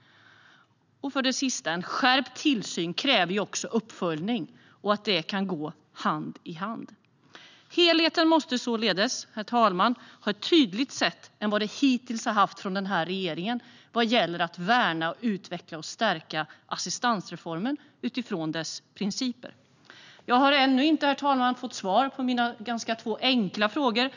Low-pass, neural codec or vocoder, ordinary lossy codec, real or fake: 7.2 kHz; none; none; real